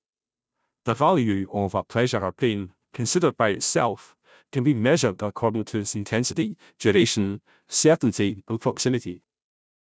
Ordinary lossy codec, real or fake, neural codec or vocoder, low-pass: none; fake; codec, 16 kHz, 0.5 kbps, FunCodec, trained on Chinese and English, 25 frames a second; none